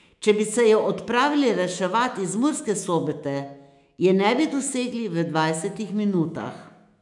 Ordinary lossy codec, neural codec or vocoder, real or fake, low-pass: none; autoencoder, 48 kHz, 128 numbers a frame, DAC-VAE, trained on Japanese speech; fake; 10.8 kHz